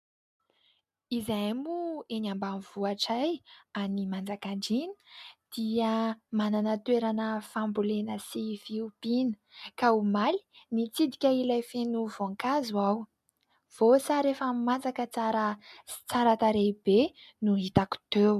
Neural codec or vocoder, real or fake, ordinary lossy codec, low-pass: none; real; MP3, 96 kbps; 14.4 kHz